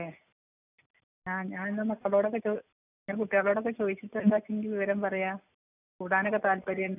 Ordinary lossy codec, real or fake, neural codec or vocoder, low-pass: none; real; none; 3.6 kHz